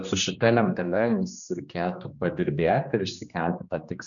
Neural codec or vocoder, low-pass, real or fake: codec, 16 kHz, 2 kbps, X-Codec, HuBERT features, trained on general audio; 7.2 kHz; fake